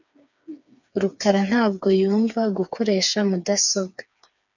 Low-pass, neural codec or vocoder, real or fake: 7.2 kHz; codec, 16 kHz, 4 kbps, FreqCodec, smaller model; fake